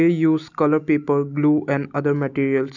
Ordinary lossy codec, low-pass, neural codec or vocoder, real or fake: none; 7.2 kHz; none; real